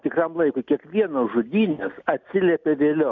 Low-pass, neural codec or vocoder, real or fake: 7.2 kHz; none; real